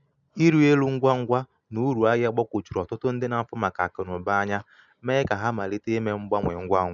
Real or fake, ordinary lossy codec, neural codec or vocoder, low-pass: real; none; none; 7.2 kHz